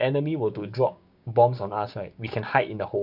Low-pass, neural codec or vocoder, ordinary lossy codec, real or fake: 5.4 kHz; codec, 16 kHz, 6 kbps, DAC; none; fake